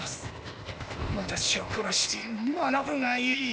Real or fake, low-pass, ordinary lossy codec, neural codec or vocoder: fake; none; none; codec, 16 kHz, 0.8 kbps, ZipCodec